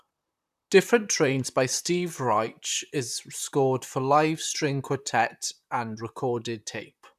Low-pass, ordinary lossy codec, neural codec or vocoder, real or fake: 14.4 kHz; none; vocoder, 44.1 kHz, 128 mel bands, Pupu-Vocoder; fake